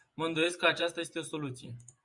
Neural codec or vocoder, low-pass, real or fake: vocoder, 24 kHz, 100 mel bands, Vocos; 10.8 kHz; fake